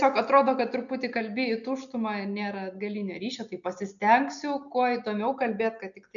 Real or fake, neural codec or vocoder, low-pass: real; none; 7.2 kHz